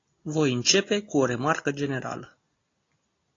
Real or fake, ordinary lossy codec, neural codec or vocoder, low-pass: real; AAC, 32 kbps; none; 7.2 kHz